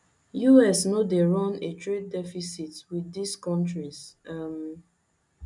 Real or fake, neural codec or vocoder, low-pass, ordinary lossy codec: real; none; 10.8 kHz; none